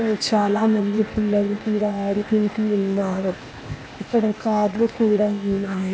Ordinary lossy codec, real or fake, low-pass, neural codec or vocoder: none; fake; none; codec, 16 kHz, 0.8 kbps, ZipCodec